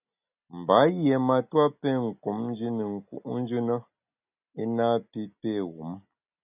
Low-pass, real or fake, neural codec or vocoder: 3.6 kHz; real; none